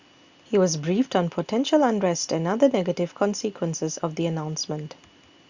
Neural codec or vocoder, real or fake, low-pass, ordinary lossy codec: none; real; 7.2 kHz; Opus, 64 kbps